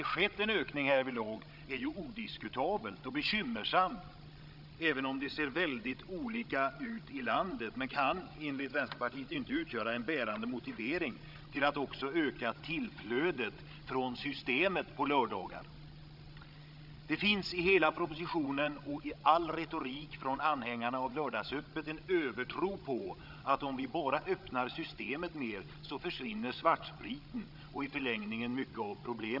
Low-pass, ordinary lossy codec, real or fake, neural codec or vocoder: 5.4 kHz; none; fake; codec, 16 kHz, 16 kbps, FreqCodec, larger model